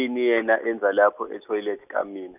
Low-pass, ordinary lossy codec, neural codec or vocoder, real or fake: 3.6 kHz; none; none; real